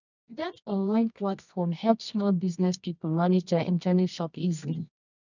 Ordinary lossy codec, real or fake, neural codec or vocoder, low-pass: none; fake; codec, 24 kHz, 0.9 kbps, WavTokenizer, medium music audio release; 7.2 kHz